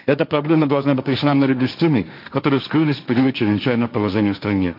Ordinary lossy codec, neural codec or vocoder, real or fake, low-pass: none; codec, 16 kHz, 1.1 kbps, Voila-Tokenizer; fake; 5.4 kHz